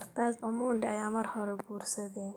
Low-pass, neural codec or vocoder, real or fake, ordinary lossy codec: none; codec, 44.1 kHz, 7.8 kbps, DAC; fake; none